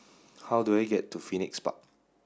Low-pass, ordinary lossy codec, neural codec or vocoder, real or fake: none; none; none; real